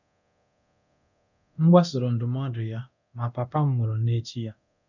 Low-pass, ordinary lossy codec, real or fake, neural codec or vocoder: 7.2 kHz; none; fake; codec, 24 kHz, 0.9 kbps, DualCodec